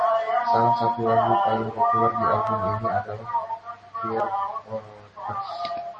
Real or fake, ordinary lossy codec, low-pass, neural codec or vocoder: real; MP3, 32 kbps; 10.8 kHz; none